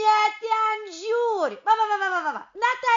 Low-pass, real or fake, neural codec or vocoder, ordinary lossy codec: 7.2 kHz; real; none; MP3, 48 kbps